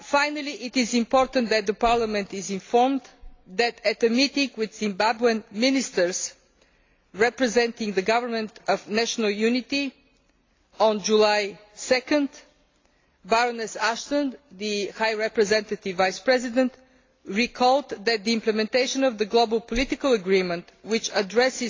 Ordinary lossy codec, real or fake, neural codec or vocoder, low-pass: AAC, 32 kbps; real; none; 7.2 kHz